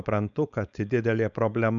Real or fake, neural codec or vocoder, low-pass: fake; codec, 16 kHz, 4.8 kbps, FACodec; 7.2 kHz